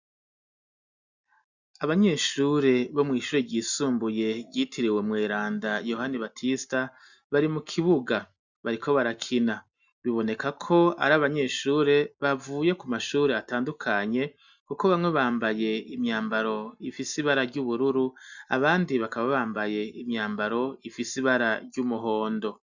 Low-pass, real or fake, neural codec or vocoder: 7.2 kHz; real; none